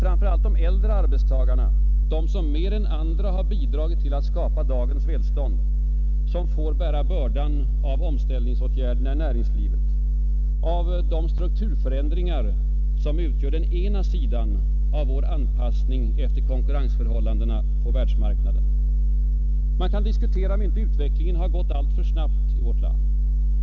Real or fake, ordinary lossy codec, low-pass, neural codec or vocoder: real; none; 7.2 kHz; none